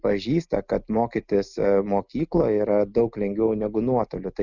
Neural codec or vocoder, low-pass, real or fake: none; 7.2 kHz; real